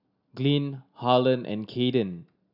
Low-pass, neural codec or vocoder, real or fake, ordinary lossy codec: 5.4 kHz; none; real; none